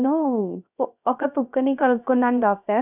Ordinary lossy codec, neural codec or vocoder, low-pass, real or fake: none; codec, 16 kHz, 0.3 kbps, FocalCodec; 3.6 kHz; fake